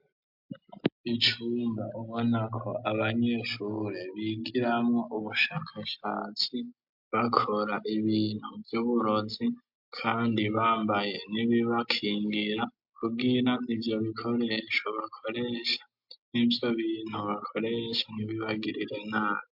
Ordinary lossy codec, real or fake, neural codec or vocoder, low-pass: MP3, 48 kbps; real; none; 5.4 kHz